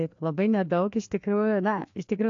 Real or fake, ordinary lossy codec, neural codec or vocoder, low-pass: fake; AAC, 48 kbps; codec, 16 kHz, 2 kbps, FreqCodec, larger model; 7.2 kHz